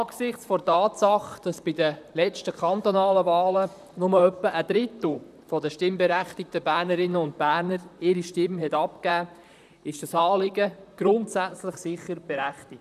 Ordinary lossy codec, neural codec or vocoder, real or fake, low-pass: none; vocoder, 44.1 kHz, 128 mel bands, Pupu-Vocoder; fake; 14.4 kHz